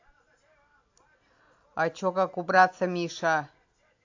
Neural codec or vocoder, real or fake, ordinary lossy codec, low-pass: none; real; AAC, 48 kbps; 7.2 kHz